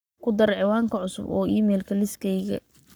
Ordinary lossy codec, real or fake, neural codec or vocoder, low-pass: none; fake; codec, 44.1 kHz, 7.8 kbps, Pupu-Codec; none